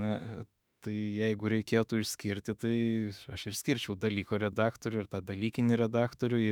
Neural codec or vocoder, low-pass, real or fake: autoencoder, 48 kHz, 32 numbers a frame, DAC-VAE, trained on Japanese speech; 19.8 kHz; fake